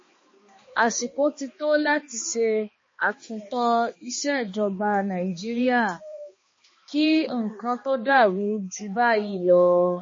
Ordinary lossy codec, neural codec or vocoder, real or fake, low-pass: MP3, 32 kbps; codec, 16 kHz, 2 kbps, X-Codec, HuBERT features, trained on balanced general audio; fake; 7.2 kHz